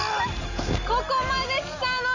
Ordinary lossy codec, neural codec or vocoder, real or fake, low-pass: none; none; real; 7.2 kHz